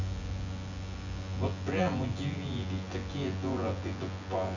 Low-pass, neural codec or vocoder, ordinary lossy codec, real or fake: 7.2 kHz; vocoder, 24 kHz, 100 mel bands, Vocos; none; fake